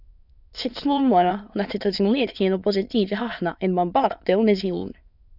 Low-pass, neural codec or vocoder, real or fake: 5.4 kHz; autoencoder, 22.05 kHz, a latent of 192 numbers a frame, VITS, trained on many speakers; fake